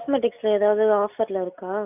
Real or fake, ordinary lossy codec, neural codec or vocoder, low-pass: real; none; none; 3.6 kHz